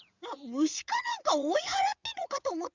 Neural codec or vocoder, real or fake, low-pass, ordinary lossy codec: vocoder, 22.05 kHz, 80 mel bands, WaveNeXt; fake; 7.2 kHz; Opus, 64 kbps